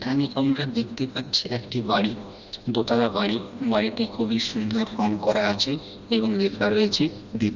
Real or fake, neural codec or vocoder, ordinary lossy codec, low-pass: fake; codec, 16 kHz, 1 kbps, FreqCodec, smaller model; Opus, 64 kbps; 7.2 kHz